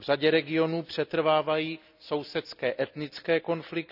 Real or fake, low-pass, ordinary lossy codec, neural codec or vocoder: real; 5.4 kHz; none; none